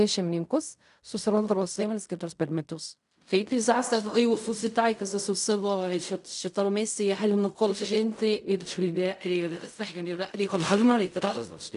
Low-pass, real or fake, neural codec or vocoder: 10.8 kHz; fake; codec, 16 kHz in and 24 kHz out, 0.4 kbps, LongCat-Audio-Codec, fine tuned four codebook decoder